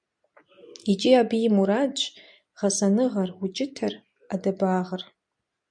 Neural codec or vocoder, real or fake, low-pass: none; real; 9.9 kHz